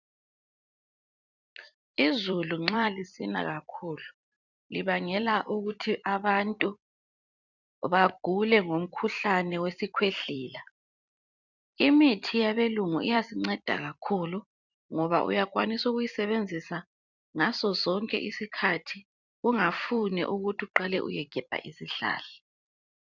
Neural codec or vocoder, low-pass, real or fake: vocoder, 44.1 kHz, 128 mel bands every 256 samples, BigVGAN v2; 7.2 kHz; fake